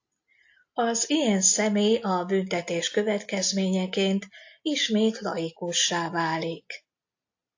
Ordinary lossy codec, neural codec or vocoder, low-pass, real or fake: AAC, 48 kbps; none; 7.2 kHz; real